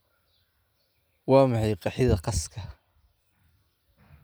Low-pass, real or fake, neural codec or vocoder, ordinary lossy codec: none; real; none; none